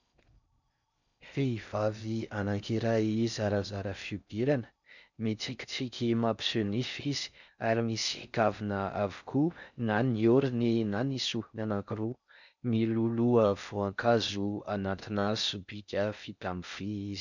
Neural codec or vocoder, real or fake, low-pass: codec, 16 kHz in and 24 kHz out, 0.6 kbps, FocalCodec, streaming, 4096 codes; fake; 7.2 kHz